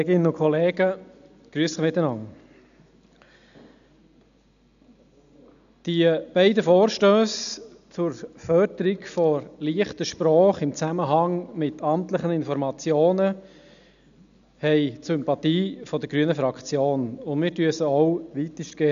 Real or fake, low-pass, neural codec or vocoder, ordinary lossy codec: real; 7.2 kHz; none; none